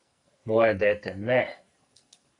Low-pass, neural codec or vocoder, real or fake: 10.8 kHz; codec, 44.1 kHz, 2.6 kbps, SNAC; fake